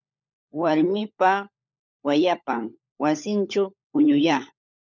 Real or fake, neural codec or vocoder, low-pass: fake; codec, 16 kHz, 16 kbps, FunCodec, trained on LibriTTS, 50 frames a second; 7.2 kHz